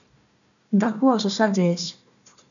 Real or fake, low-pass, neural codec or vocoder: fake; 7.2 kHz; codec, 16 kHz, 1 kbps, FunCodec, trained on Chinese and English, 50 frames a second